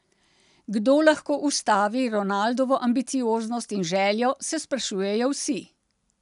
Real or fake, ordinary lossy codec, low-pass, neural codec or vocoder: real; none; 10.8 kHz; none